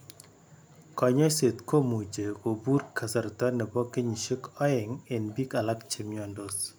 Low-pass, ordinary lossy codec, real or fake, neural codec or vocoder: none; none; real; none